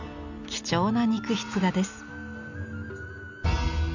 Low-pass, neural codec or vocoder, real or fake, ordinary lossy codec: 7.2 kHz; none; real; none